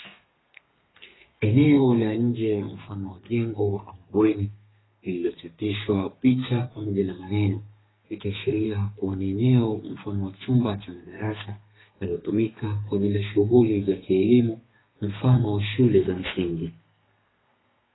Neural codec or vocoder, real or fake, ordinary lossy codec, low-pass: codec, 32 kHz, 1.9 kbps, SNAC; fake; AAC, 16 kbps; 7.2 kHz